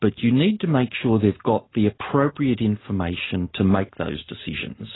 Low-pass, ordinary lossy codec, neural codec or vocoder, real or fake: 7.2 kHz; AAC, 16 kbps; none; real